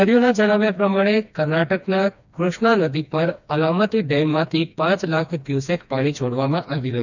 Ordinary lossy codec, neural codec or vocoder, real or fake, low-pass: none; codec, 16 kHz, 2 kbps, FreqCodec, smaller model; fake; 7.2 kHz